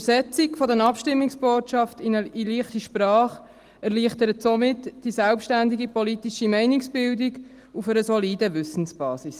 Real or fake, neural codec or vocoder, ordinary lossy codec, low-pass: real; none; Opus, 24 kbps; 14.4 kHz